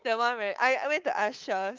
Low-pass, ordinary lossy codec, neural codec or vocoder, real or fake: 7.2 kHz; Opus, 32 kbps; codec, 24 kHz, 3.1 kbps, DualCodec; fake